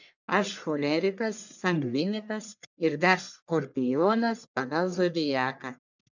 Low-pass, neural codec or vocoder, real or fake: 7.2 kHz; codec, 44.1 kHz, 1.7 kbps, Pupu-Codec; fake